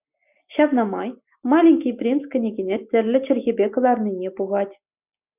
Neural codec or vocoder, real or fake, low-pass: none; real; 3.6 kHz